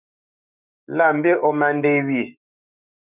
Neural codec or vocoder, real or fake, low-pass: autoencoder, 48 kHz, 128 numbers a frame, DAC-VAE, trained on Japanese speech; fake; 3.6 kHz